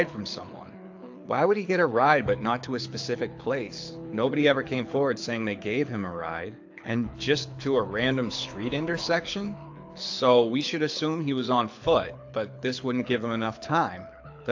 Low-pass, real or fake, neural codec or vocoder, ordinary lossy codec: 7.2 kHz; fake; codec, 24 kHz, 6 kbps, HILCodec; AAC, 48 kbps